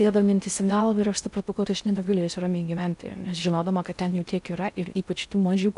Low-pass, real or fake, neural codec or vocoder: 10.8 kHz; fake; codec, 16 kHz in and 24 kHz out, 0.6 kbps, FocalCodec, streaming, 4096 codes